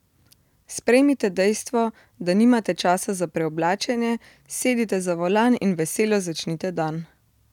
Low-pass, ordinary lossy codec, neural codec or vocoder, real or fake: 19.8 kHz; none; none; real